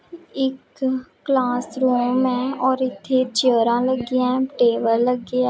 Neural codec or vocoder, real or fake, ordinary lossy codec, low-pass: none; real; none; none